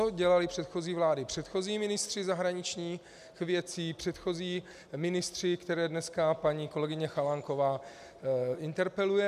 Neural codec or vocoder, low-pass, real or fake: none; 14.4 kHz; real